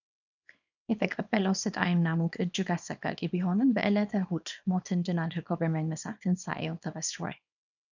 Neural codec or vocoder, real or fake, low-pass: codec, 24 kHz, 0.9 kbps, WavTokenizer, small release; fake; 7.2 kHz